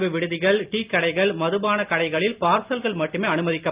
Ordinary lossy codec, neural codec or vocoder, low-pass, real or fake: Opus, 24 kbps; none; 3.6 kHz; real